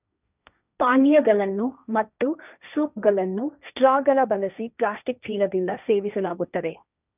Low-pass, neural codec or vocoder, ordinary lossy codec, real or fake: 3.6 kHz; codec, 16 kHz, 1.1 kbps, Voila-Tokenizer; none; fake